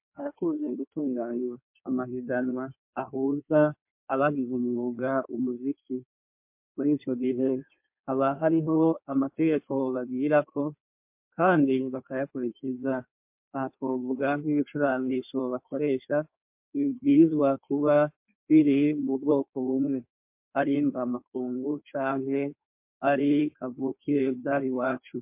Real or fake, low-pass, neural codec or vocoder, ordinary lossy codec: fake; 3.6 kHz; codec, 16 kHz in and 24 kHz out, 1.1 kbps, FireRedTTS-2 codec; MP3, 32 kbps